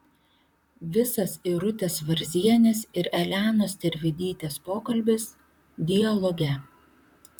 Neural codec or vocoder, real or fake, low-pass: vocoder, 44.1 kHz, 128 mel bands, Pupu-Vocoder; fake; 19.8 kHz